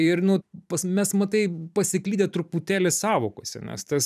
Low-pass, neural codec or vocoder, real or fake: 14.4 kHz; vocoder, 44.1 kHz, 128 mel bands every 512 samples, BigVGAN v2; fake